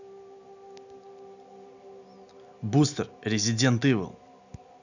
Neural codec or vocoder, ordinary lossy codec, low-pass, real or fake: none; none; 7.2 kHz; real